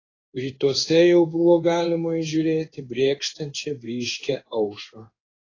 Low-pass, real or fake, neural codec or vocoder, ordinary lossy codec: 7.2 kHz; fake; codec, 16 kHz in and 24 kHz out, 1 kbps, XY-Tokenizer; AAC, 32 kbps